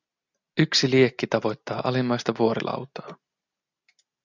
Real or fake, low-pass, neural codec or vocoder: real; 7.2 kHz; none